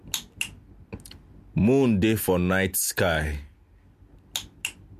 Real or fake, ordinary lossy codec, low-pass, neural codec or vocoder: real; MP3, 64 kbps; 14.4 kHz; none